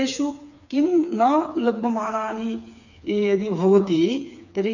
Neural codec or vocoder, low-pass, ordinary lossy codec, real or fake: codec, 16 kHz, 4 kbps, FreqCodec, smaller model; 7.2 kHz; none; fake